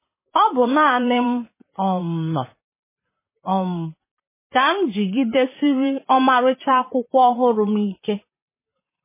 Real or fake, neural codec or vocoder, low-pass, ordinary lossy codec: fake; vocoder, 44.1 kHz, 128 mel bands, Pupu-Vocoder; 3.6 kHz; MP3, 16 kbps